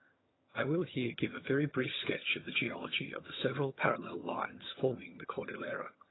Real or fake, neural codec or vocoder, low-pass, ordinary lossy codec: fake; vocoder, 22.05 kHz, 80 mel bands, HiFi-GAN; 7.2 kHz; AAC, 16 kbps